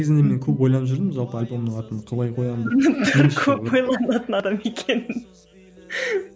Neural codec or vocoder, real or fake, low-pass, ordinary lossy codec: none; real; none; none